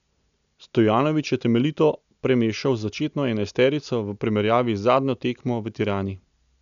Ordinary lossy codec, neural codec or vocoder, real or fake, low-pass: none; none; real; 7.2 kHz